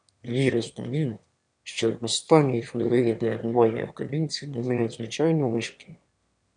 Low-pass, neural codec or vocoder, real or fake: 9.9 kHz; autoencoder, 22.05 kHz, a latent of 192 numbers a frame, VITS, trained on one speaker; fake